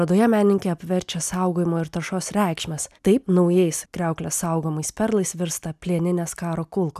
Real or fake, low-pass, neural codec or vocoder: real; 14.4 kHz; none